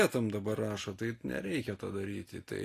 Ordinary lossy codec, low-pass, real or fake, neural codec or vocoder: AAC, 48 kbps; 14.4 kHz; real; none